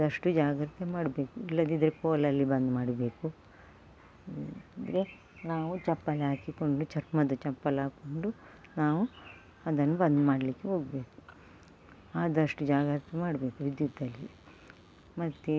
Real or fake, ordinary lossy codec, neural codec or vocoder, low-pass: real; none; none; none